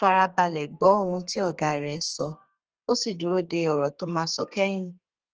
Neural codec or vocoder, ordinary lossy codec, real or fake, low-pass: codec, 32 kHz, 1.9 kbps, SNAC; Opus, 24 kbps; fake; 7.2 kHz